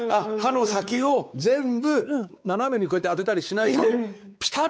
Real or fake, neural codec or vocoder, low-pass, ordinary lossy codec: fake; codec, 16 kHz, 4 kbps, X-Codec, WavLM features, trained on Multilingual LibriSpeech; none; none